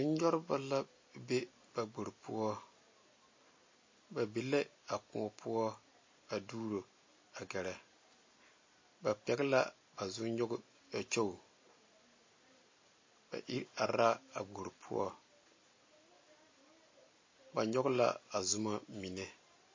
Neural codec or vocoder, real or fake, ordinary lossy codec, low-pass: none; real; MP3, 32 kbps; 7.2 kHz